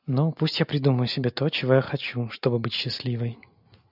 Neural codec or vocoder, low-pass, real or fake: none; 5.4 kHz; real